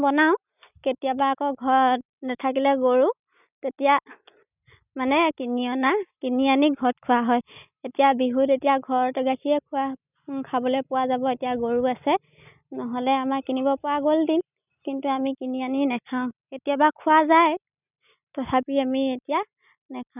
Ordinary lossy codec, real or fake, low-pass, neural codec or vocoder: none; real; 3.6 kHz; none